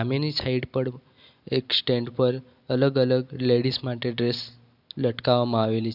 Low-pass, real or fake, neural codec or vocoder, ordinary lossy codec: 5.4 kHz; real; none; none